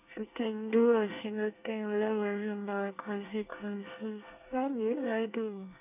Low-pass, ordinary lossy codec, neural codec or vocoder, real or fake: 3.6 kHz; none; codec, 24 kHz, 1 kbps, SNAC; fake